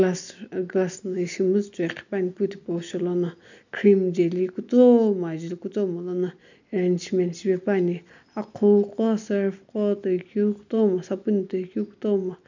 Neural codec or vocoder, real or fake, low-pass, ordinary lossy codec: none; real; 7.2 kHz; none